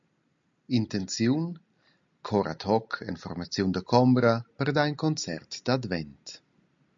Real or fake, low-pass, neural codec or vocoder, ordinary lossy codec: real; 7.2 kHz; none; MP3, 64 kbps